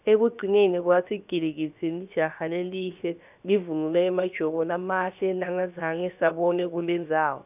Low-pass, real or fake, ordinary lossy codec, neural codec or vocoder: 3.6 kHz; fake; none; codec, 16 kHz, about 1 kbps, DyCAST, with the encoder's durations